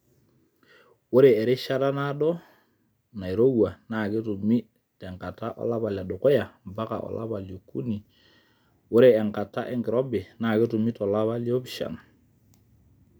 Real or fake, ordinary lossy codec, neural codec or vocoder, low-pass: real; none; none; none